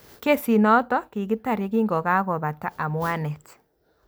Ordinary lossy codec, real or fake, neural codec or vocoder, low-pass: none; real; none; none